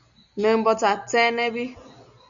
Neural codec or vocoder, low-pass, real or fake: none; 7.2 kHz; real